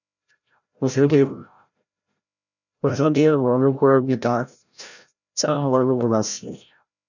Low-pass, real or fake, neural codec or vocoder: 7.2 kHz; fake; codec, 16 kHz, 0.5 kbps, FreqCodec, larger model